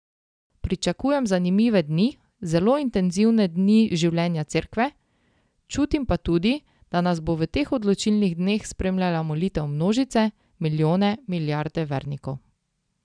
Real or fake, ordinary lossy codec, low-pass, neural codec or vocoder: real; none; 9.9 kHz; none